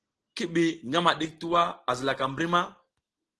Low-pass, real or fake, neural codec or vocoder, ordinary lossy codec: 10.8 kHz; real; none; Opus, 16 kbps